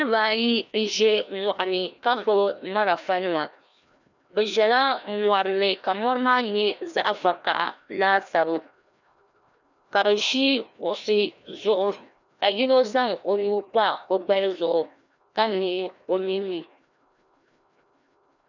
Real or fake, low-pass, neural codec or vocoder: fake; 7.2 kHz; codec, 16 kHz, 1 kbps, FreqCodec, larger model